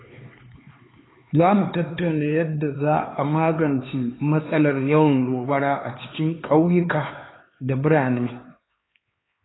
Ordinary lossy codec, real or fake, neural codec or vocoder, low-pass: AAC, 16 kbps; fake; codec, 16 kHz, 4 kbps, X-Codec, HuBERT features, trained on LibriSpeech; 7.2 kHz